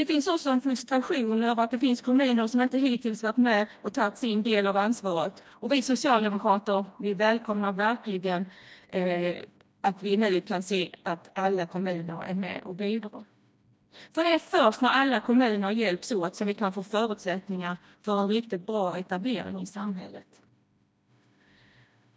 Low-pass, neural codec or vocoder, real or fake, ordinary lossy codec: none; codec, 16 kHz, 1 kbps, FreqCodec, smaller model; fake; none